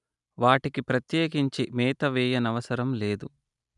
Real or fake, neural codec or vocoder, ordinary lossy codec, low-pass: real; none; none; 10.8 kHz